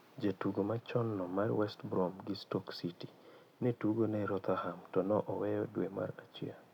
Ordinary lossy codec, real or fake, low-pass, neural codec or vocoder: none; real; 19.8 kHz; none